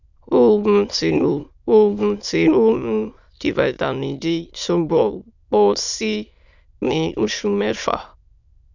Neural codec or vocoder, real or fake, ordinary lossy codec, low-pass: autoencoder, 22.05 kHz, a latent of 192 numbers a frame, VITS, trained on many speakers; fake; none; 7.2 kHz